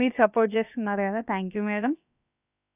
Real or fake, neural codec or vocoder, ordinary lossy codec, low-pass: fake; codec, 16 kHz, about 1 kbps, DyCAST, with the encoder's durations; none; 3.6 kHz